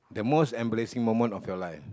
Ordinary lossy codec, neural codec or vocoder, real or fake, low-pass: none; none; real; none